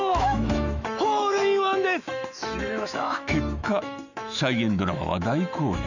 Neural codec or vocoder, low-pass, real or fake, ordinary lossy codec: autoencoder, 48 kHz, 128 numbers a frame, DAC-VAE, trained on Japanese speech; 7.2 kHz; fake; none